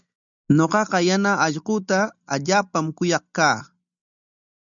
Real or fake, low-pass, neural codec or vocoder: real; 7.2 kHz; none